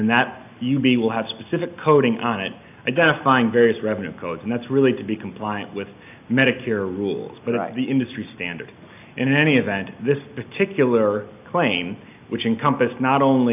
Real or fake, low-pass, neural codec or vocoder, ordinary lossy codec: real; 3.6 kHz; none; AAC, 32 kbps